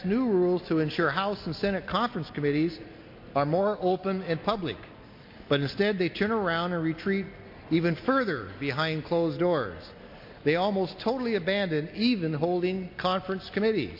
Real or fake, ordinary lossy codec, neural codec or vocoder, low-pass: real; MP3, 32 kbps; none; 5.4 kHz